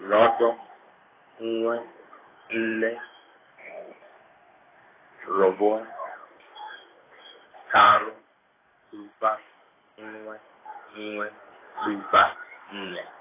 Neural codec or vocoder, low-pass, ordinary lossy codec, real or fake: codec, 16 kHz in and 24 kHz out, 1 kbps, XY-Tokenizer; 3.6 kHz; AAC, 16 kbps; fake